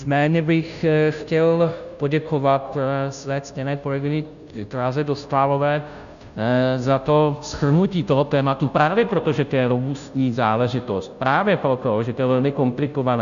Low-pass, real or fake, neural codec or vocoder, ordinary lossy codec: 7.2 kHz; fake; codec, 16 kHz, 0.5 kbps, FunCodec, trained on Chinese and English, 25 frames a second; MP3, 96 kbps